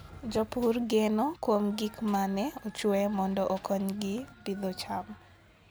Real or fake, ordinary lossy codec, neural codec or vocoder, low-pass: real; none; none; none